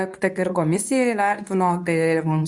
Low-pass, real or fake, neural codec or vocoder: 10.8 kHz; fake; codec, 24 kHz, 0.9 kbps, WavTokenizer, medium speech release version 2